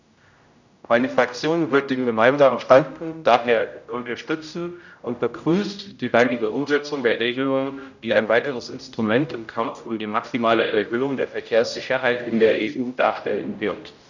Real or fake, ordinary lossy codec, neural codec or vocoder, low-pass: fake; none; codec, 16 kHz, 0.5 kbps, X-Codec, HuBERT features, trained on general audio; 7.2 kHz